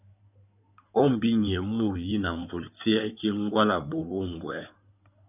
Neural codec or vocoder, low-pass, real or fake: codec, 16 kHz in and 24 kHz out, 2.2 kbps, FireRedTTS-2 codec; 3.6 kHz; fake